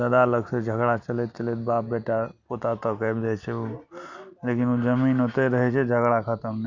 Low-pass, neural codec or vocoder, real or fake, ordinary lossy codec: 7.2 kHz; none; real; none